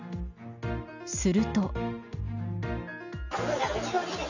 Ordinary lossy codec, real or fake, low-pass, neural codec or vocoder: none; real; 7.2 kHz; none